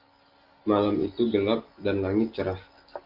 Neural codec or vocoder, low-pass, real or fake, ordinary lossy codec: none; 5.4 kHz; real; Opus, 24 kbps